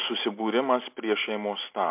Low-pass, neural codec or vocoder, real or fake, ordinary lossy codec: 3.6 kHz; none; real; MP3, 32 kbps